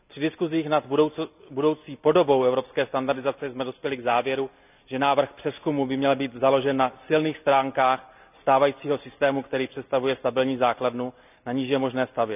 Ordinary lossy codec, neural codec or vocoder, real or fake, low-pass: none; none; real; 3.6 kHz